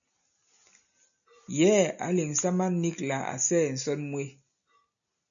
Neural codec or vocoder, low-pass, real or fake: none; 7.2 kHz; real